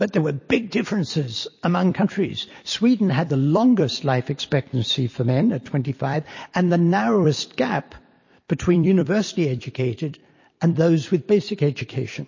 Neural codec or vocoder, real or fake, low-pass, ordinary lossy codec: vocoder, 44.1 kHz, 128 mel bands every 256 samples, BigVGAN v2; fake; 7.2 kHz; MP3, 32 kbps